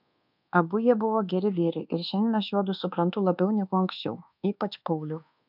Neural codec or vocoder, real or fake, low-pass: codec, 24 kHz, 1.2 kbps, DualCodec; fake; 5.4 kHz